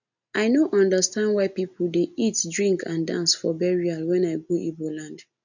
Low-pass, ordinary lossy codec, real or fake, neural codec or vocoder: 7.2 kHz; none; real; none